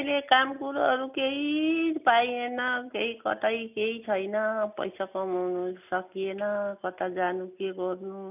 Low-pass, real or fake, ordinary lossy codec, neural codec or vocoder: 3.6 kHz; real; none; none